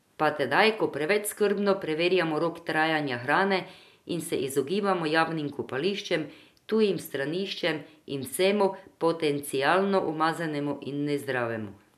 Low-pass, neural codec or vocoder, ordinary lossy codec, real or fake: 14.4 kHz; none; none; real